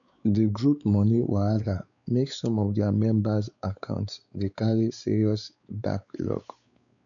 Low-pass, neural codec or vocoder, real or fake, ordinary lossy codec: 7.2 kHz; codec, 16 kHz, 4 kbps, X-Codec, WavLM features, trained on Multilingual LibriSpeech; fake; none